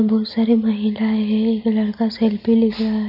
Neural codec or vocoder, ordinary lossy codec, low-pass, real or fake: none; none; 5.4 kHz; real